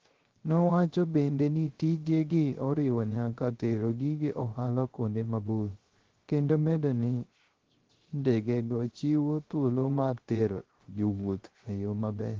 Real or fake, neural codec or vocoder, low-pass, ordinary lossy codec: fake; codec, 16 kHz, 0.3 kbps, FocalCodec; 7.2 kHz; Opus, 16 kbps